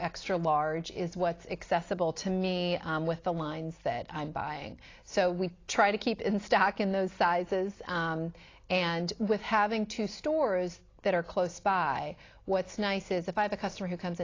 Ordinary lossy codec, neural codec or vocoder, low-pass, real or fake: AAC, 32 kbps; none; 7.2 kHz; real